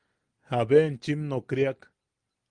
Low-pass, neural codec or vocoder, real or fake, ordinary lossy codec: 9.9 kHz; none; real; Opus, 32 kbps